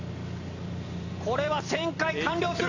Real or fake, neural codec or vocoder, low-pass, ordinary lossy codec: fake; vocoder, 44.1 kHz, 128 mel bands every 256 samples, BigVGAN v2; 7.2 kHz; AAC, 48 kbps